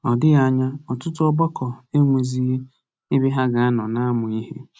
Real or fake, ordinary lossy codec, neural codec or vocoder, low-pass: real; none; none; none